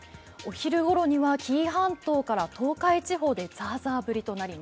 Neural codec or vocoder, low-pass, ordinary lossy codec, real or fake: none; none; none; real